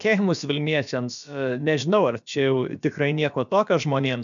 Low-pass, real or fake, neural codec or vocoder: 7.2 kHz; fake; codec, 16 kHz, about 1 kbps, DyCAST, with the encoder's durations